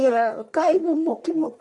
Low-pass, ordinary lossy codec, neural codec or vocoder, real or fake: 10.8 kHz; Opus, 64 kbps; codec, 44.1 kHz, 1.7 kbps, Pupu-Codec; fake